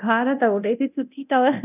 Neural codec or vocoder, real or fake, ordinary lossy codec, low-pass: codec, 24 kHz, 0.9 kbps, DualCodec; fake; none; 3.6 kHz